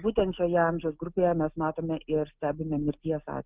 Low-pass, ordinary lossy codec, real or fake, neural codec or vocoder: 3.6 kHz; Opus, 32 kbps; real; none